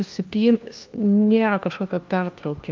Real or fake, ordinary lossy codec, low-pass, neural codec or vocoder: fake; Opus, 24 kbps; 7.2 kHz; codec, 16 kHz, 1 kbps, FunCodec, trained on LibriTTS, 50 frames a second